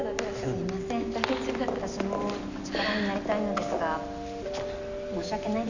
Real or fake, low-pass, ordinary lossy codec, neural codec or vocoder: real; 7.2 kHz; none; none